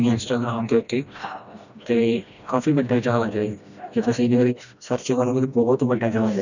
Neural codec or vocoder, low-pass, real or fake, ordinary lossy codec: codec, 16 kHz, 1 kbps, FreqCodec, smaller model; 7.2 kHz; fake; none